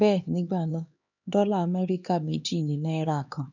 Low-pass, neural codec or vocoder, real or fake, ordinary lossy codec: 7.2 kHz; codec, 16 kHz, 2 kbps, X-Codec, HuBERT features, trained on LibriSpeech; fake; none